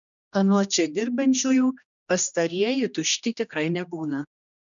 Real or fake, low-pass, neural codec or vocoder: fake; 7.2 kHz; codec, 16 kHz, 1 kbps, X-Codec, HuBERT features, trained on general audio